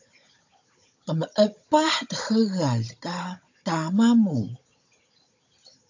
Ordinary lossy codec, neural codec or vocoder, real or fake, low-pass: AAC, 48 kbps; codec, 16 kHz, 16 kbps, FunCodec, trained on Chinese and English, 50 frames a second; fake; 7.2 kHz